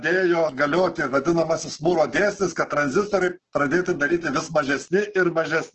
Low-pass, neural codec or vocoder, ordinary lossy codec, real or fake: 7.2 kHz; codec, 16 kHz, 6 kbps, DAC; Opus, 16 kbps; fake